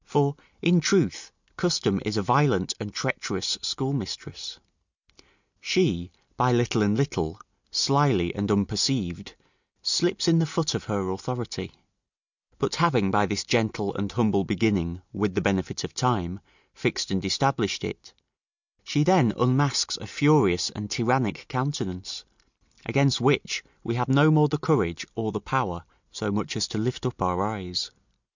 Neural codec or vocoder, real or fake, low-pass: none; real; 7.2 kHz